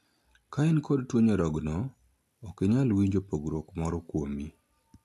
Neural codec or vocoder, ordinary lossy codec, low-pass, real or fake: none; AAC, 64 kbps; 14.4 kHz; real